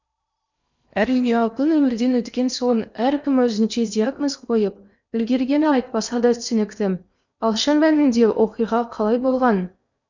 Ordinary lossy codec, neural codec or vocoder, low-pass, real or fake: none; codec, 16 kHz in and 24 kHz out, 0.8 kbps, FocalCodec, streaming, 65536 codes; 7.2 kHz; fake